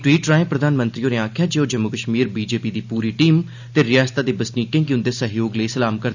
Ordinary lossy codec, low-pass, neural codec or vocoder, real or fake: none; 7.2 kHz; none; real